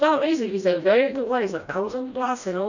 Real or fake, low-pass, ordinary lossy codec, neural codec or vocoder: fake; 7.2 kHz; none; codec, 16 kHz, 1 kbps, FreqCodec, smaller model